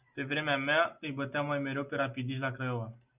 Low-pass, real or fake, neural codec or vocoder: 3.6 kHz; real; none